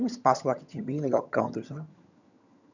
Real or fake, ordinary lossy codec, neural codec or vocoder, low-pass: fake; none; vocoder, 22.05 kHz, 80 mel bands, HiFi-GAN; 7.2 kHz